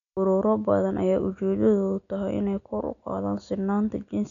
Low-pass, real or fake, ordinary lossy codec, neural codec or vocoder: 7.2 kHz; real; none; none